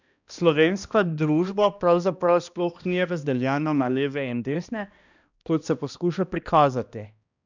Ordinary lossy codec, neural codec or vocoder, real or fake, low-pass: none; codec, 16 kHz, 1 kbps, X-Codec, HuBERT features, trained on balanced general audio; fake; 7.2 kHz